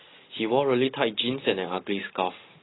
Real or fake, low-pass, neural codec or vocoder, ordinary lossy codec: real; 7.2 kHz; none; AAC, 16 kbps